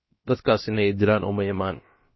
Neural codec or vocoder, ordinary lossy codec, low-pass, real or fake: codec, 16 kHz, about 1 kbps, DyCAST, with the encoder's durations; MP3, 24 kbps; 7.2 kHz; fake